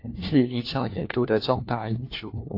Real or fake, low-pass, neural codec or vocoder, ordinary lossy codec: fake; 5.4 kHz; codec, 16 kHz, 1 kbps, FunCodec, trained on LibriTTS, 50 frames a second; AAC, 32 kbps